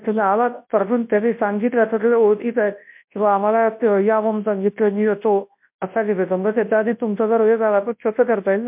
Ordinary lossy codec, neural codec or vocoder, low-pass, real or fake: MP3, 32 kbps; codec, 24 kHz, 0.9 kbps, WavTokenizer, large speech release; 3.6 kHz; fake